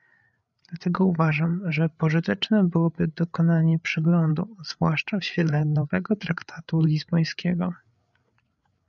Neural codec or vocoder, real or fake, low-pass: codec, 16 kHz, 8 kbps, FreqCodec, larger model; fake; 7.2 kHz